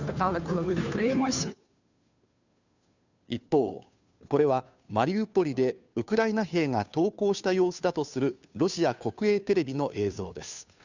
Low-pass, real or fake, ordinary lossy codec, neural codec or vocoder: 7.2 kHz; fake; none; codec, 16 kHz, 2 kbps, FunCodec, trained on Chinese and English, 25 frames a second